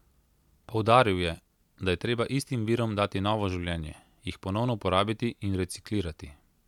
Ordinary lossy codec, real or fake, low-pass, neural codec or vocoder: none; real; 19.8 kHz; none